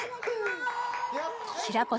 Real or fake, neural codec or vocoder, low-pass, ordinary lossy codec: real; none; none; none